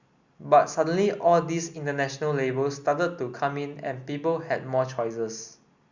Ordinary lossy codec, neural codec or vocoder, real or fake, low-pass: Opus, 64 kbps; none; real; 7.2 kHz